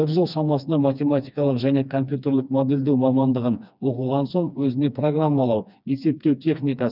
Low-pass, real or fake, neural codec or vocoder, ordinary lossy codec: 5.4 kHz; fake; codec, 16 kHz, 2 kbps, FreqCodec, smaller model; none